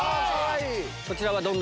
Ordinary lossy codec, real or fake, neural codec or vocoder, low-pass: none; real; none; none